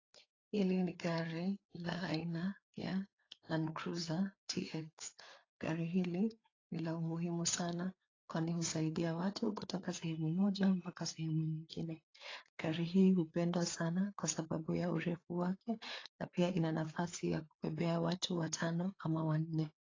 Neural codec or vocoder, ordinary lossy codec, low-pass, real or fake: codec, 16 kHz, 4 kbps, FreqCodec, larger model; AAC, 32 kbps; 7.2 kHz; fake